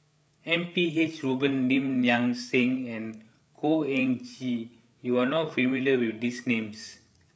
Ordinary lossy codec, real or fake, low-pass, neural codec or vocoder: none; fake; none; codec, 16 kHz, 8 kbps, FreqCodec, larger model